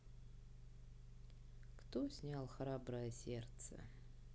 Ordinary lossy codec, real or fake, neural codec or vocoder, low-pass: none; real; none; none